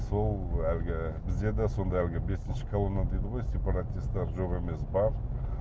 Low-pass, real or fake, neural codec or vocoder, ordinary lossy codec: none; real; none; none